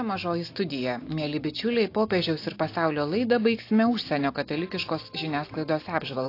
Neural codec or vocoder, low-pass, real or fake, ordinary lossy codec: none; 5.4 kHz; real; AAC, 32 kbps